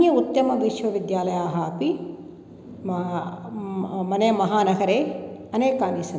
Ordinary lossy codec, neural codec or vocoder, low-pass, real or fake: none; none; none; real